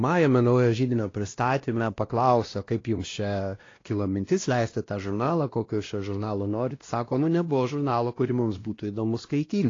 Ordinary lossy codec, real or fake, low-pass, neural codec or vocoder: AAC, 32 kbps; fake; 7.2 kHz; codec, 16 kHz, 1 kbps, X-Codec, WavLM features, trained on Multilingual LibriSpeech